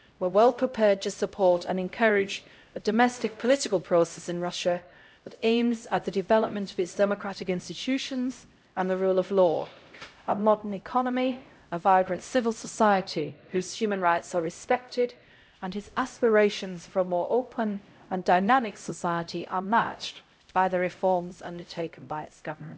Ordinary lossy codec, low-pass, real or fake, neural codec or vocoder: none; none; fake; codec, 16 kHz, 0.5 kbps, X-Codec, HuBERT features, trained on LibriSpeech